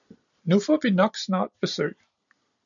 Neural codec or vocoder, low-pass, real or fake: none; 7.2 kHz; real